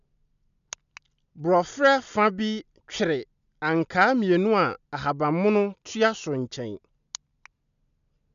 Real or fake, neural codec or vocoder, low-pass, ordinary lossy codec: real; none; 7.2 kHz; none